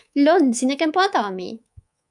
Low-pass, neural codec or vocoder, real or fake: 10.8 kHz; codec, 24 kHz, 3.1 kbps, DualCodec; fake